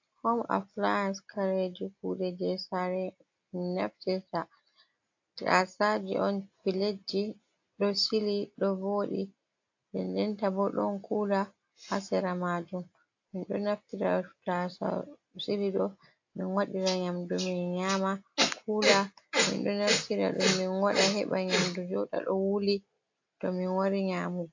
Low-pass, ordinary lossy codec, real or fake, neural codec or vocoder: 7.2 kHz; AAC, 48 kbps; real; none